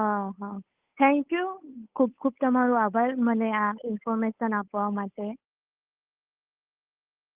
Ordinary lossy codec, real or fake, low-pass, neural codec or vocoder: Opus, 24 kbps; fake; 3.6 kHz; codec, 16 kHz, 8 kbps, FunCodec, trained on Chinese and English, 25 frames a second